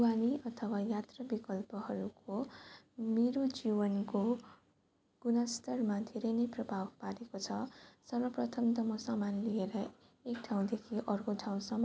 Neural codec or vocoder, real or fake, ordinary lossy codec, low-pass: none; real; none; none